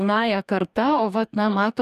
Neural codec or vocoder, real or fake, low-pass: codec, 44.1 kHz, 2.6 kbps, DAC; fake; 14.4 kHz